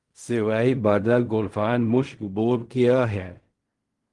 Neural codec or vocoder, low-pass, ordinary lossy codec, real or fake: codec, 16 kHz in and 24 kHz out, 0.4 kbps, LongCat-Audio-Codec, fine tuned four codebook decoder; 10.8 kHz; Opus, 24 kbps; fake